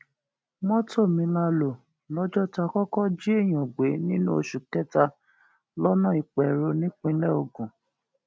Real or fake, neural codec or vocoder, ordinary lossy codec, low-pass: real; none; none; none